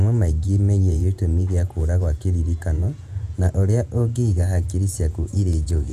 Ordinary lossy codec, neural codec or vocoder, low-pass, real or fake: none; vocoder, 48 kHz, 128 mel bands, Vocos; 14.4 kHz; fake